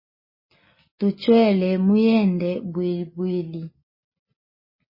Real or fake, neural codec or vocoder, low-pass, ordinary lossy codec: real; none; 5.4 kHz; MP3, 24 kbps